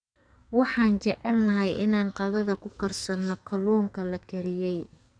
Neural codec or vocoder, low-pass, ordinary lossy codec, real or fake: codec, 44.1 kHz, 2.6 kbps, SNAC; 9.9 kHz; none; fake